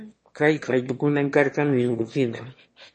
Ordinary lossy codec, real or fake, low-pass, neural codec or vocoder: MP3, 32 kbps; fake; 9.9 kHz; autoencoder, 22.05 kHz, a latent of 192 numbers a frame, VITS, trained on one speaker